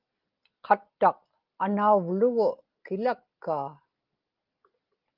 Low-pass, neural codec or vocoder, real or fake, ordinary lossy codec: 5.4 kHz; none; real; Opus, 32 kbps